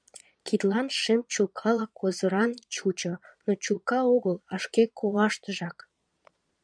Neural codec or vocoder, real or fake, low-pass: vocoder, 22.05 kHz, 80 mel bands, Vocos; fake; 9.9 kHz